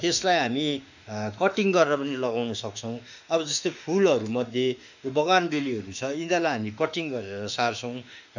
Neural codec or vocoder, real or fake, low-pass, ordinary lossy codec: autoencoder, 48 kHz, 32 numbers a frame, DAC-VAE, trained on Japanese speech; fake; 7.2 kHz; none